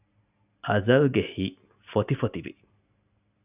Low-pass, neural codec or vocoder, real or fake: 3.6 kHz; none; real